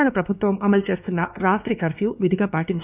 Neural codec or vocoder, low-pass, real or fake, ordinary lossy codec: codec, 16 kHz, 2 kbps, X-Codec, WavLM features, trained on Multilingual LibriSpeech; 3.6 kHz; fake; none